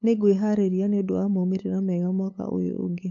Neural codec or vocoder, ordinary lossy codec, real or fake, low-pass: codec, 16 kHz, 8 kbps, FunCodec, trained on LibriTTS, 25 frames a second; MP3, 48 kbps; fake; 7.2 kHz